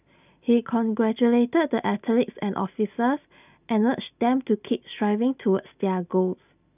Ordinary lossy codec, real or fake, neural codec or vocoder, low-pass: none; real; none; 3.6 kHz